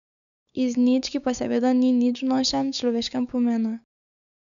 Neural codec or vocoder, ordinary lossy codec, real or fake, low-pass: codec, 16 kHz, 6 kbps, DAC; none; fake; 7.2 kHz